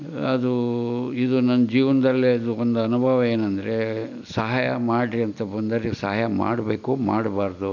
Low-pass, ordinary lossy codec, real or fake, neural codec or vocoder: 7.2 kHz; none; real; none